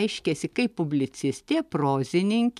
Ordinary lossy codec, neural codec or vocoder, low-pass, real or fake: MP3, 96 kbps; none; 14.4 kHz; real